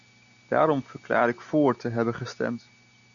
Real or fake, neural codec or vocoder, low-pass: real; none; 7.2 kHz